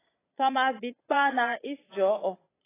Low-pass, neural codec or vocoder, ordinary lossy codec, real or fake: 3.6 kHz; vocoder, 22.05 kHz, 80 mel bands, Vocos; AAC, 16 kbps; fake